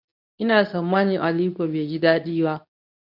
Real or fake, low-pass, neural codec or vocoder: fake; 5.4 kHz; codec, 24 kHz, 0.9 kbps, WavTokenizer, medium speech release version 2